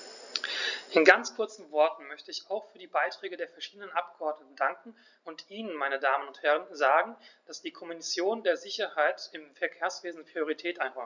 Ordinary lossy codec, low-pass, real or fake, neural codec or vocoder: none; 7.2 kHz; real; none